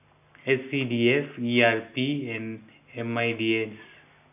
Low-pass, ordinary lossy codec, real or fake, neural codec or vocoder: 3.6 kHz; AAC, 32 kbps; real; none